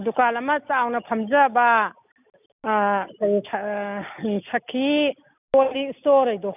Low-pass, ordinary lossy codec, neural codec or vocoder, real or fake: 3.6 kHz; none; none; real